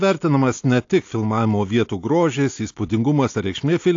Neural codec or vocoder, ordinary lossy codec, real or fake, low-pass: none; AAC, 48 kbps; real; 7.2 kHz